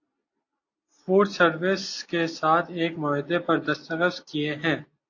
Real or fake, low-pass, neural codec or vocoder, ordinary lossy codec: real; 7.2 kHz; none; AAC, 48 kbps